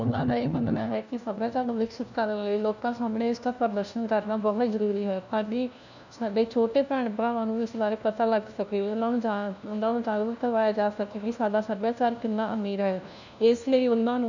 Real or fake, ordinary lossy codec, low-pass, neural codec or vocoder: fake; none; 7.2 kHz; codec, 16 kHz, 1 kbps, FunCodec, trained on LibriTTS, 50 frames a second